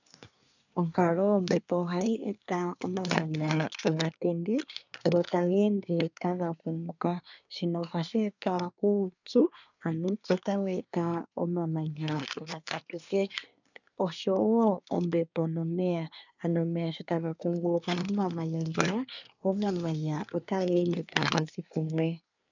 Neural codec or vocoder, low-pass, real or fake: codec, 24 kHz, 1 kbps, SNAC; 7.2 kHz; fake